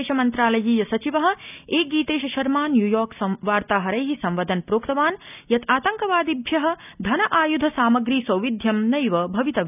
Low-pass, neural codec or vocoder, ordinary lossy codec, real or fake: 3.6 kHz; none; none; real